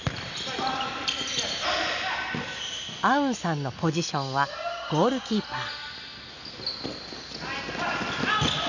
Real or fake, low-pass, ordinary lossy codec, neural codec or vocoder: real; 7.2 kHz; none; none